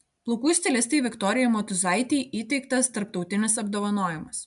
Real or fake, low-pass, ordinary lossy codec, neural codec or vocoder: real; 10.8 kHz; MP3, 96 kbps; none